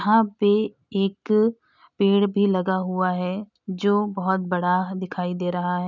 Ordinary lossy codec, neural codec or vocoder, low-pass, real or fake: none; none; 7.2 kHz; real